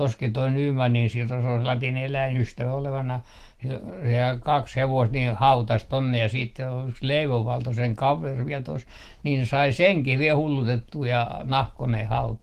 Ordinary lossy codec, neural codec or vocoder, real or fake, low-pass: Opus, 16 kbps; autoencoder, 48 kHz, 128 numbers a frame, DAC-VAE, trained on Japanese speech; fake; 14.4 kHz